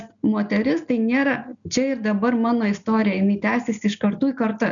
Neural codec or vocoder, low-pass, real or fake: none; 7.2 kHz; real